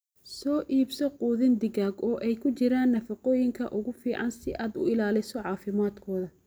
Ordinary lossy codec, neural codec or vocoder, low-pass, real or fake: none; none; none; real